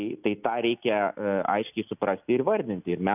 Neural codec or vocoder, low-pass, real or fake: none; 3.6 kHz; real